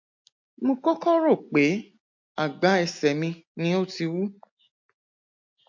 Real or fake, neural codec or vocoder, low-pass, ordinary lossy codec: real; none; 7.2 kHz; MP3, 48 kbps